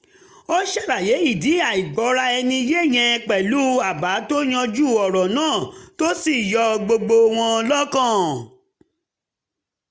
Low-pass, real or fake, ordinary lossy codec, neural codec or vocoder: none; real; none; none